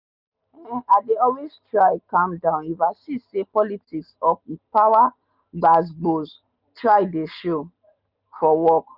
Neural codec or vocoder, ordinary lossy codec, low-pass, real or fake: none; none; 5.4 kHz; real